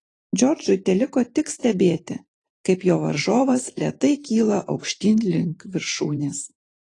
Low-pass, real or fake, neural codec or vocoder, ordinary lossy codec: 10.8 kHz; fake; vocoder, 44.1 kHz, 128 mel bands every 512 samples, BigVGAN v2; AAC, 32 kbps